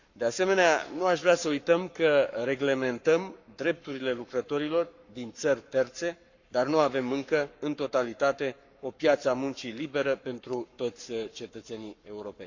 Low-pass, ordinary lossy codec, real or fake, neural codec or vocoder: 7.2 kHz; none; fake; codec, 44.1 kHz, 7.8 kbps, Pupu-Codec